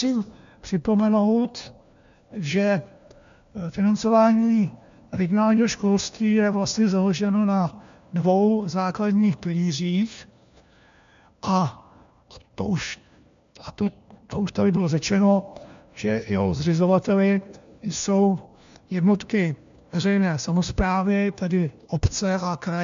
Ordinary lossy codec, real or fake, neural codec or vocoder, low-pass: MP3, 64 kbps; fake; codec, 16 kHz, 1 kbps, FunCodec, trained on LibriTTS, 50 frames a second; 7.2 kHz